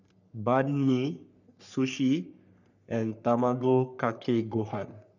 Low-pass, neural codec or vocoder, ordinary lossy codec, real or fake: 7.2 kHz; codec, 44.1 kHz, 3.4 kbps, Pupu-Codec; none; fake